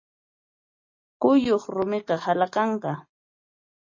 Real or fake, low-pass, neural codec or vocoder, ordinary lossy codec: real; 7.2 kHz; none; MP3, 32 kbps